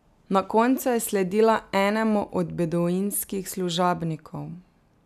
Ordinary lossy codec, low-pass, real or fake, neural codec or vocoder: none; 14.4 kHz; real; none